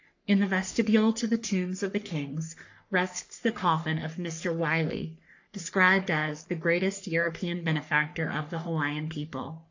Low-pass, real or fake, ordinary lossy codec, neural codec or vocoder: 7.2 kHz; fake; AAC, 48 kbps; codec, 44.1 kHz, 3.4 kbps, Pupu-Codec